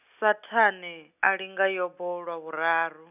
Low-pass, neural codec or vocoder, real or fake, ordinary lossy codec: 3.6 kHz; none; real; none